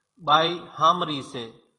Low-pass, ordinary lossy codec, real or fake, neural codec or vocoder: 10.8 kHz; AAC, 32 kbps; fake; vocoder, 44.1 kHz, 128 mel bands every 512 samples, BigVGAN v2